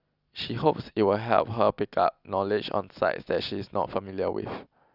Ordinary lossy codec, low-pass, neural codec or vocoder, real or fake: none; 5.4 kHz; none; real